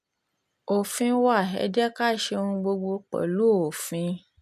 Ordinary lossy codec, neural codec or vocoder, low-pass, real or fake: none; none; 14.4 kHz; real